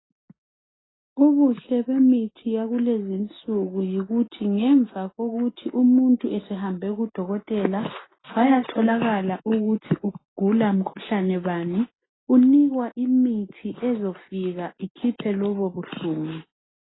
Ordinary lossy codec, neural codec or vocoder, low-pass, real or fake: AAC, 16 kbps; none; 7.2 kHz; real